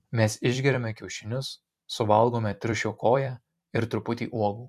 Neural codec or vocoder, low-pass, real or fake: vocoder, 44.1 kHz, 128 mel bands every 256 samples, BigVGAN v2; 14.4 kHz; fake